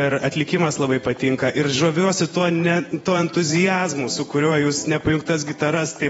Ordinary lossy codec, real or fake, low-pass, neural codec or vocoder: AAC, 24 kbps; fake; 19.8 kHz; vocoder, 48 kHz, 128 mel bands, Vocos